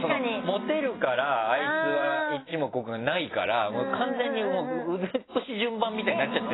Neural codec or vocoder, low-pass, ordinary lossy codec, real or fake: none; 7.2 kHz; AAC, 16 kbps; real